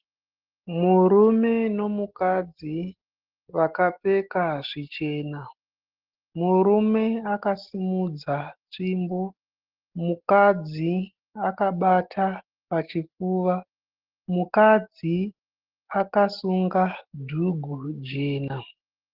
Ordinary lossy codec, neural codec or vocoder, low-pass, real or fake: Opus, 16 kbps; none; 5.4 kHz; real